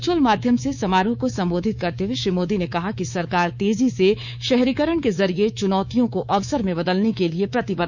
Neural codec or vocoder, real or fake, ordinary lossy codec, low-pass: codec, 24 kHz, 3.1 kbps, DualCodec; fake; none; 7.2 kHz